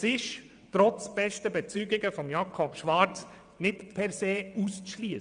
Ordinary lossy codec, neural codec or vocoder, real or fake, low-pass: none; vocoder, 22.05 kHz, 80 mel bands, WaveNeXt; fake; 9.9 kHz